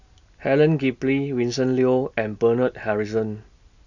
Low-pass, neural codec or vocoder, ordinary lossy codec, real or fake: 7.2 kHz; none; none; real